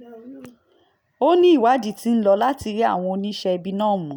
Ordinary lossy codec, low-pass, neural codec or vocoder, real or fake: none; none; none; real